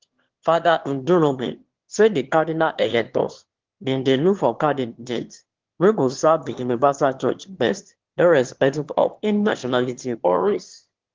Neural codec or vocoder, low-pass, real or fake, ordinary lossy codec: autoencoder, 22.05 kHz, a latent of 192 numbers a frame, VITS, trained on one speaker; 7.2 kHz; fake; Opus, 16 kbps